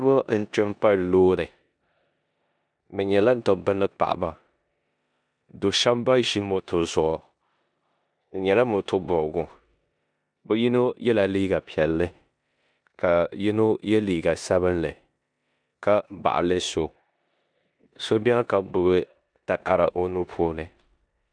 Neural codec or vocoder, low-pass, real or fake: codec, 16 kHz in and 24 kHz out, 0.9 kbps, LongCat-Audio-Codec, four codebook decoder; 9.9 kHz; fake